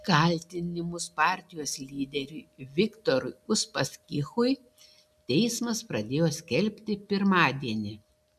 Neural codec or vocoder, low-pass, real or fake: none; 14.4 kHz; real